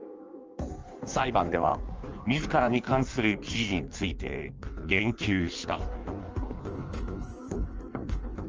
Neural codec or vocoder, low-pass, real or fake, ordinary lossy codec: codec, 16 kHz in and 24 kHz out, 1.1 kbps, FireRedTTS-2 codec; 7.2 kHz; fake; Opus, 24 kbps